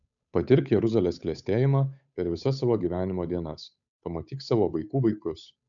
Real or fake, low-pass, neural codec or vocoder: fake; 7.2 kHz; codec, 16 kHz, 8 kbps, FunCodec, trained on Chinese and English, 25 frames a second